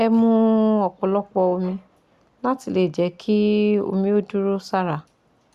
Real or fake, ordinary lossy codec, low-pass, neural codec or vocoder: real; Opus, 64 kbps; 14.4 kHz; none